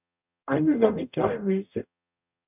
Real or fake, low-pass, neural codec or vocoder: fake; 3.6 kHz; codec, 44.1 kHz, 0.9 kbps, DAC